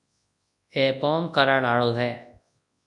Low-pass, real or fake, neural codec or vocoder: 10.8 kHz; fake; codec, 24 kHz, 0.9 kbps, WavTokenizer, large speech release